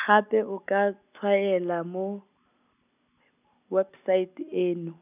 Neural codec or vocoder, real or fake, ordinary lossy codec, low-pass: none; real; none; 3.6 kHz